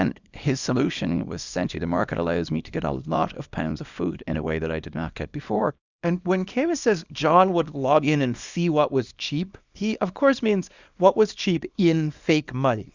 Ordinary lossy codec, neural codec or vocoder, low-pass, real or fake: Opus, 64 kbps; codec, 24 kHz, 0.9 kbps, WavTokenizer, small release; 7.2 kHz; fake